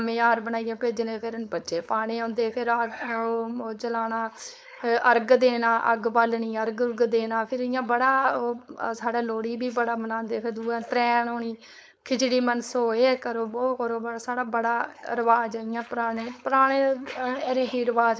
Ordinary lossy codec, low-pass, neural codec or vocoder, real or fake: none; none; codec, 16 kHz, 4.8 kbps, FACodec; fake